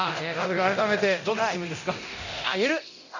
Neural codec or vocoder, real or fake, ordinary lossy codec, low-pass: codec, 24 kHz, 0.9 kbps, DualCodec; fake; none; 7.2 kHz